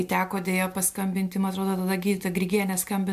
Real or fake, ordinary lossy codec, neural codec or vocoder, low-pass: real; MP3, 96 kbps; none; 14.4 kHz